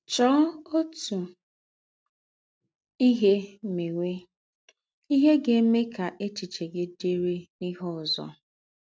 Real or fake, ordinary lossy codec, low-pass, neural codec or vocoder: real; none; none; none